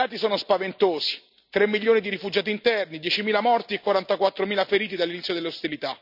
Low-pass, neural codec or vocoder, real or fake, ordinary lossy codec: 5.4 kHz; none; real; none